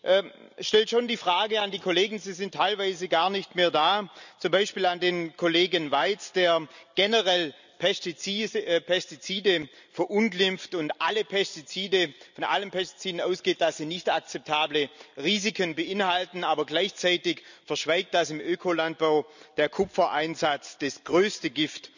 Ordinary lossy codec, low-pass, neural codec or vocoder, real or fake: none; 7.2 kHz; none; real